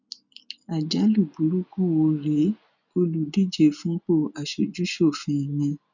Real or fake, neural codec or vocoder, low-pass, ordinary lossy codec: real; none; 7.2 kHz; none